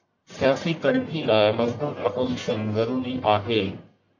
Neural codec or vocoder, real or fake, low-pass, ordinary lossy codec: codec, 44.1 kHz, 1.7 kbps, Pupu-Codec; fake; 7.2 kHz; MP3, 48 kbps